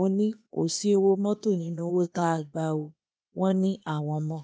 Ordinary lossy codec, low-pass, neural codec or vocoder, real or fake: none; none; codec, 16 kHz, 0.8 kbps, ZipCodec; fake